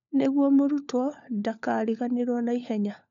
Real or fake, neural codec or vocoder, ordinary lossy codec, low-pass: fake; codec, 16 kHz, 4 kbps, FunCodec, trained on LibriTTS, 50 frames a second; none; 7.2 kHz